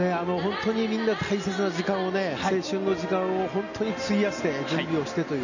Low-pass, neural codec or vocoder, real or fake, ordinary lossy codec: 7.2 kHz; none; real; none